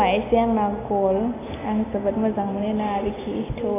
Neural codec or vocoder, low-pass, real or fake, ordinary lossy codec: none; 3.6 kHz; real; AAC, 24 kbps